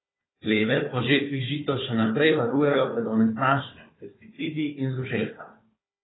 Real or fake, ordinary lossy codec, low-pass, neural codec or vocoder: fake; AAC, 16 kbps; 7.2 kHz; codec, 16 kHz, 4 kbps, FunCodec, trained on Chinese and English, 50 frames a second